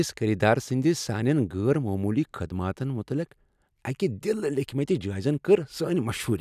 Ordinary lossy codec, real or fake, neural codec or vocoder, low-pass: none; real; none; 14.4 kHz